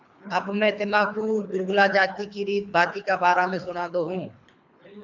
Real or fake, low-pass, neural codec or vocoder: fake; 7.2 kHz; codec, 24 kHz, 3 kbps, HILCodec